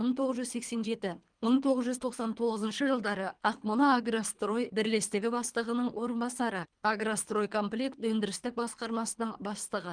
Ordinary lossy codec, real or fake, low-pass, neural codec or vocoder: Opus, 24 kbps; fake; 9.9 kHz; codec, 24 kHz, 3 kbps, HILCodec